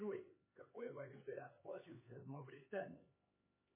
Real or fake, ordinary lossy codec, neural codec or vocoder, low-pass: fake; MP3, 16 kbps; codec, 16 kHz, 4 kbps, X-Codec, HuBERT features, trained on LibriSpeech; 3.6 kHz